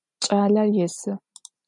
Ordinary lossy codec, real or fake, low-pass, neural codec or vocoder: MP3, 96 kbps; real; 10.8 kHz; none